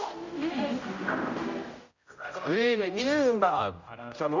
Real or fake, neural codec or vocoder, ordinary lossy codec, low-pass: fake; codec, 16 kHz, 0.5 kbps, X-Codec, HuBERT features, trained on general audio; Opus, 64 kbps; 7.2 kHz